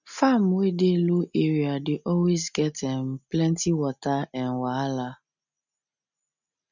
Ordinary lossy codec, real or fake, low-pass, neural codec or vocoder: none; real; 7.2 kHz; none